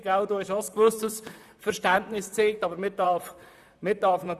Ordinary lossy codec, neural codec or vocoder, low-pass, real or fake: none; vocoder, 44.1 kHz, 128 mel bands, Pupu-Vocoder; 14.4 kHz; fake